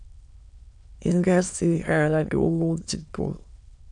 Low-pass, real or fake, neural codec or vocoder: 9.9 kHz; fake; autoencoder, 22.05 kHz, a latent of 192 numbers a frame, VITS, trained on many speakers